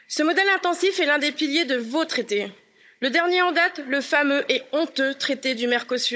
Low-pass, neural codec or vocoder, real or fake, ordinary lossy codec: none; codec, 16 kHz, 16 kbps, FunCodec, trained on Chinese and English, 50 frames a second; fake; none